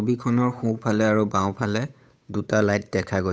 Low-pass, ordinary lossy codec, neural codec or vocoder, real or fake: none; none; codec, 16 kHz, 8 kbps, FunCodec, trained on Chinese and English, 25 frames a second; fake